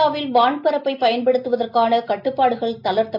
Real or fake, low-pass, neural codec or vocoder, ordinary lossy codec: real; 5.4 kHz; none; MP3, 48 kbps